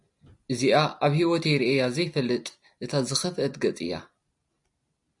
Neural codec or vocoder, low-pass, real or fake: none; 10.8 kHz; real